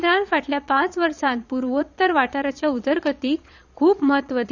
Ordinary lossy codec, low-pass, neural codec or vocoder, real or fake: none; 7.2 kHz; vocoder, 22.05 kHz, 80 mel bands, Vocos; fake